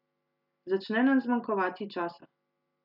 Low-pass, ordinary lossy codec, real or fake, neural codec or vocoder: 5.4 kHz; none; real; none